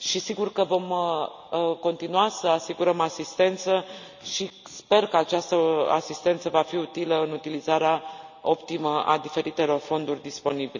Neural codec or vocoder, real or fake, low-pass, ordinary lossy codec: none; real; 7.2 kHz; none